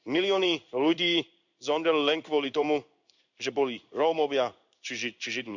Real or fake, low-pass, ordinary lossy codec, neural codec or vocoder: fake; 7.2 kHz; none; codec, 16 kHz in and 24 kHz out, 1 kbps, XY-Tokenizer